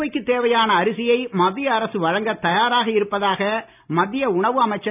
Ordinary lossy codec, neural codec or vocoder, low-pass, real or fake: none; none; 3.6 kHz; real